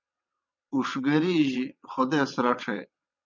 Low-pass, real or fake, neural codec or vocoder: 7.2 kHz; fake; vocoder, 22.05 kHz, 80 mel bands, WaveNeXt